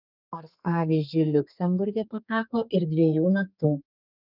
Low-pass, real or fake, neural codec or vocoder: 5.4 kHz; fake; codec, 32 kHz, 1.9 kbps, SNAC